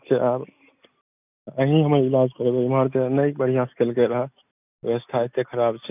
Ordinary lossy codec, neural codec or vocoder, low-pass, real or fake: none; none; 3.6 kHz; real